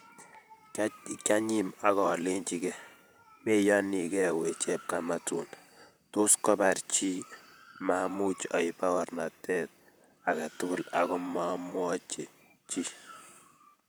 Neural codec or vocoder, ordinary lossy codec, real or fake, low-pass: vocoder, 44.1 kHz, 128 mel bands, Pupu-Vocoder; none; fake; none